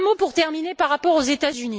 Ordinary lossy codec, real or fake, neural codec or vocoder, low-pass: none; real; none; none